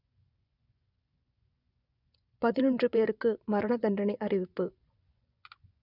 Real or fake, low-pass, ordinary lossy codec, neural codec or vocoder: fake; 5.4 kHz; none; vocoder, 24 kHz, 100 mel bands, Vocos